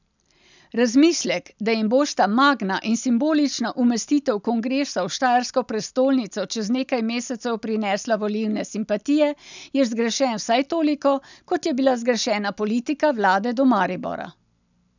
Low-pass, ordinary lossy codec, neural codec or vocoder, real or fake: 7.2 kHz; none; none; real